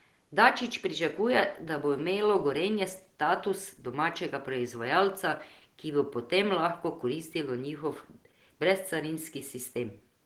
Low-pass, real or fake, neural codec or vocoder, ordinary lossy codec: 19.8 kHz; real; none; Opus, 16 kbps